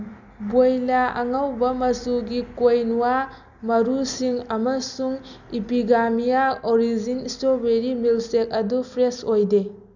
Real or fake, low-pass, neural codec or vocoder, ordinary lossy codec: real; 7.2 kHz; none; none